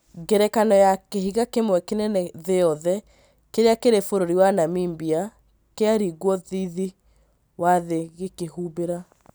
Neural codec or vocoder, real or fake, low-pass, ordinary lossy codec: none; real; none; none